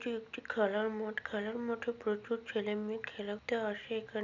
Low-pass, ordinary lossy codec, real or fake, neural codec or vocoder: 7.2 kHz; none; real; none